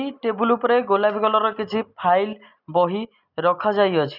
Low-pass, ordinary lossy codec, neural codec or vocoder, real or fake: 5.4 kHz; none; none; real